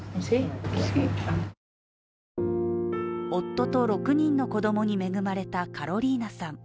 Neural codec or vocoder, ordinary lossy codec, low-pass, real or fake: none; none; none; real